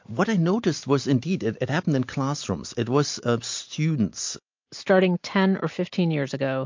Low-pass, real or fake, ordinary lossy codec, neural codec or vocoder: 7.2 kHz; real; MP3, 48 kbps; none